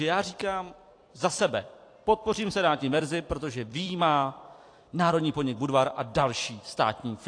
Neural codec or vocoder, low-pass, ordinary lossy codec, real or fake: vocoder, 44.1 kHz, 128 mel bands every 256 samples, BigVGAN v2; 9.9 kHz; AAC, 48 kbps; fake